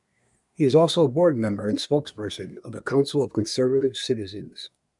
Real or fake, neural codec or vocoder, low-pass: fake; codec, 24 kHz, 1 kbps, SNAC; 10.8 kHz